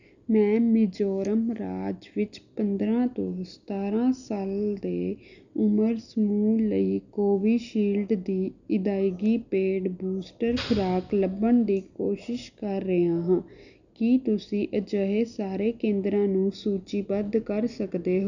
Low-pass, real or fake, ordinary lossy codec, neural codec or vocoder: 7.2 kHz; real; none; none